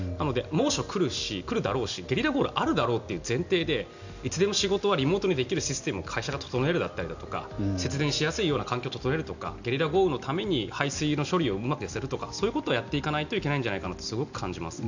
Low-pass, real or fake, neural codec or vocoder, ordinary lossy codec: 7.2 kHz; real; none; none